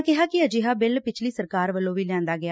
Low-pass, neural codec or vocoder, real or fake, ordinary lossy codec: none; none; real; none